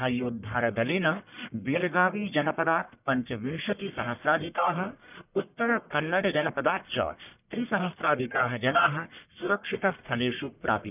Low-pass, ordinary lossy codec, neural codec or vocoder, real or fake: 3.6 kHz; none; codec, 44.1 kHz, 1.7 kbps, Pupu-Codec; fake